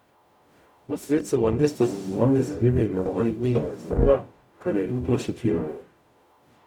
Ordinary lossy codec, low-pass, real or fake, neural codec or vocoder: none; 19.8 kHz; fake; codec, 44.1 kHz, 0.9 kbps, DAC